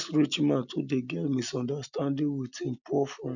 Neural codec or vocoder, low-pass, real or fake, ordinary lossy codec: none; 7.2 kHz; real; none